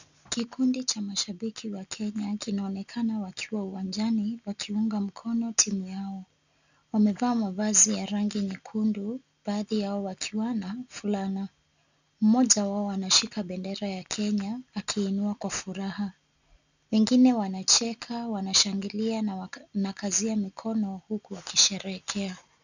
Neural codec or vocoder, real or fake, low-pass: none; real; 7.2 kHz